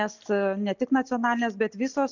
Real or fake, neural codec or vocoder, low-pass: real; none; 7.2 kHz